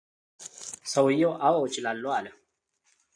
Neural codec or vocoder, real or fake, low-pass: none; real; 9.9 kHz